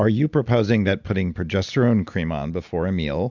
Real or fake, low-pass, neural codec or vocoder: real; 7.2 kHz; none